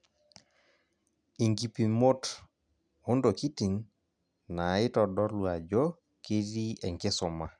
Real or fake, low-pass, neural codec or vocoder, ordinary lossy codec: real; 9.9 kHz; none; none